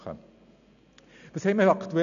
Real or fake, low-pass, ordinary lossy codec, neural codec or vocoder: real; 7.2 kHz; none; none